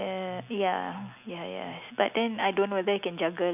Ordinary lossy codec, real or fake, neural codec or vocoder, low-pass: none; real; none; 3.6 kHz